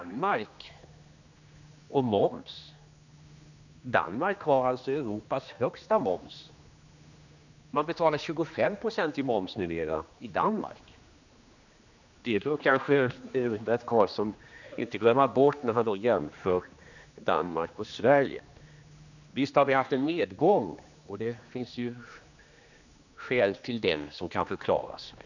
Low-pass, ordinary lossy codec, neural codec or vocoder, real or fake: 7.2 kHz; none; codec, 16 kHz, 2 kbps, X-Codec, HuBERT features, trained on general audio; fake